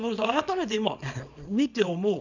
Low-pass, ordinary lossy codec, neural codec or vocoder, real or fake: 7.2 kHz; none; codec, 24 kHz, 0.9 kbps, WavTokenizer, small release; fake